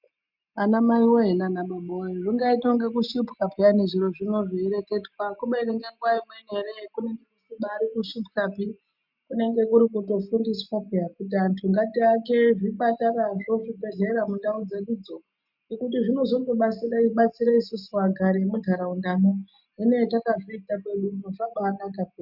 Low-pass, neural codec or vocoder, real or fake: 5.4 kHz; none; real